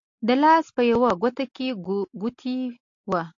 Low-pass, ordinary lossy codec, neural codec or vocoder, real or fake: 7.2 kHz; AAC, 48 kbps; none; real